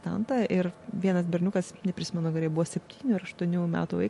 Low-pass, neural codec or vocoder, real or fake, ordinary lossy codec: 14.4 kHz; none; real; MP3, 48 kbps